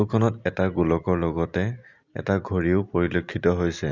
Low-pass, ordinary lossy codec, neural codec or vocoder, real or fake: 7.2 kHz; none; none; real